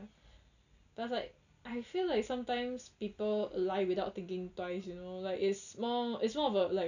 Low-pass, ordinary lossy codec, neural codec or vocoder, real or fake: 7.2 kHz; none; none; real